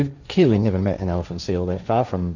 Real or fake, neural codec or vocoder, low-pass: fake; codec, 16 kHz, 1.1 kbps, Voila-Tokenizer; 7.2 kHz